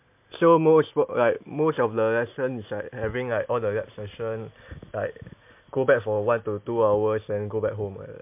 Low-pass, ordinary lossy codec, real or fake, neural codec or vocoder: 3.6 kHz; MP3, 32 kbps; real; none